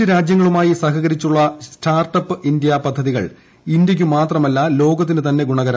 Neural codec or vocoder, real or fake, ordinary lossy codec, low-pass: none; real; none; none